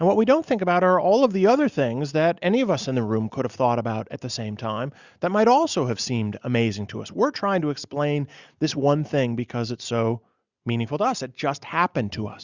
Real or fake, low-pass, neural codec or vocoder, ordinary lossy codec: real; 7.2 kHz; none; Opus, 64 kbps